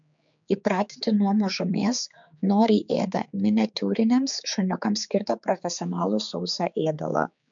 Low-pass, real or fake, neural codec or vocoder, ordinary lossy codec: 7.2 kHz; fake; codec, 16 kHz, 4 kbps, X-Codec, HuBERT features, trained on general audio; MP3, 64 kbps